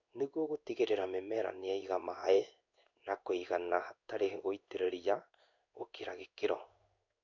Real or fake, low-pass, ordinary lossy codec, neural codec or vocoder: fake; 7.2 kHz; none; codec, 16 kHz in and 24 kHz out, 1 kbps, XY-Tokenizer